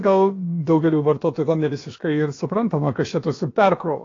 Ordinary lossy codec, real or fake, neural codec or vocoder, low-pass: AAC, 32 kbps; fake; codec, 16 kHz, about 1 kbps, DyCAST, with the encoder's durations; 7.2 kHz